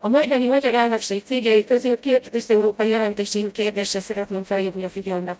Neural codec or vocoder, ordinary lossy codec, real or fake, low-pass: codec, 16 kHz, 0.5 kbps, FreqCodec, smaller model; none; fake; none